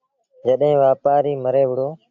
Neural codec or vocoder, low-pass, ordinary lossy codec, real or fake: none; 7.2 kHz; AAC, 48 kbps; real